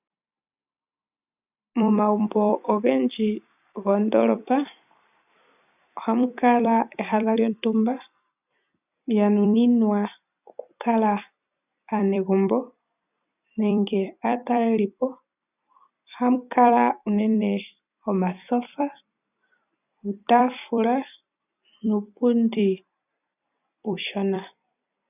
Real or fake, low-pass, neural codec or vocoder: fake; 3.6 kHz; vocoder, 44.1 kHz, 128 mel bands every 256 samples, BigVGAN v2